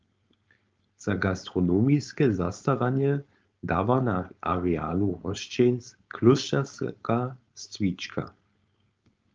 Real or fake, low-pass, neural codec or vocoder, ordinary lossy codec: fake; 7.2 kHz; codec, 16 kHz, 4.8 kbps, FACodec; Opus, 24 kbps